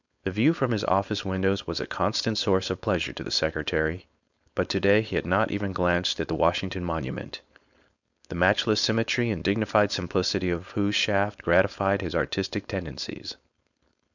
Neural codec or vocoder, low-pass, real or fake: codec, 16 kHz, 4.8 kbps, FACodec; 7.2 kHz; fake